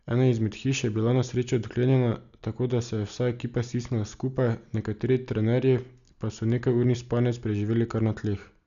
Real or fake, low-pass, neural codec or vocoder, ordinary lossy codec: real; 7.2 kHz; none; none